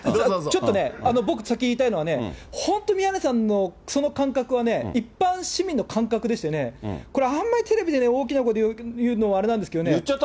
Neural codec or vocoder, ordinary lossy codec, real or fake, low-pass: none; none; real; none